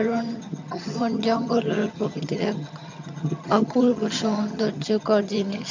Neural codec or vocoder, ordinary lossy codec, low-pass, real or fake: vocoder, 22.05 kHz, 80 mel bands, HiFi-GAN; MP3, 64 kbps; 7.2 kHz; fake